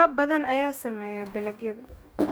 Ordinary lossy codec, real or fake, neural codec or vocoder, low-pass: none; fake; codec, 44.1 kHz, 2.6 kbps, DAC; none